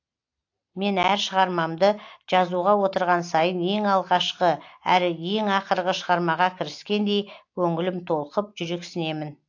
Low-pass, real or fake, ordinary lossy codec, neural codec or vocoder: 7.2 kHz; real; AAC, 48 kbps; none